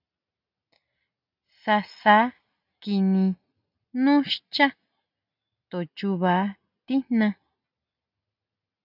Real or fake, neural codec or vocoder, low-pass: real; none; 5.4 kHz